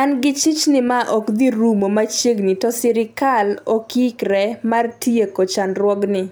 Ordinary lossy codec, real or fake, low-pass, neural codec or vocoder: none; real; none; none